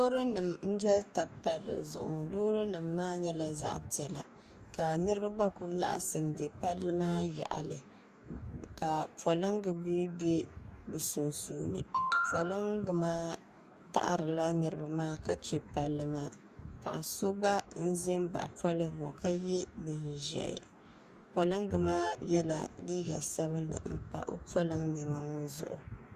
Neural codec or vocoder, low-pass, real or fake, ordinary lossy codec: codec, 44.1 kHz, 2.6 kbps, DAC; 14.4 kHz; fake; Opus, 64 kbps